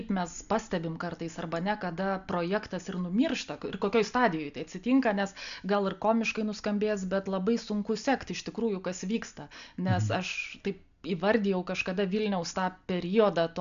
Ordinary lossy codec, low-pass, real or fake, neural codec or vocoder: MP3, 96 kbps; 7.2 kHz; real; none